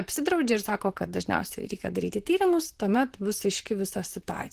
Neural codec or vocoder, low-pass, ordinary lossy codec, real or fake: vocoder, 44.1 kHz, 128 mel bands, Pupu-Vocoder; 14.4 kHz; Opus, 16 kbps; fake